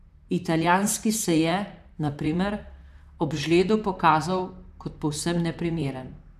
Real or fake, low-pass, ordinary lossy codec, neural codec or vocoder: fake; 14.4 kHz; none; vocoder, 44.1 kHz, 128 mel bands, Pupu-Vocoder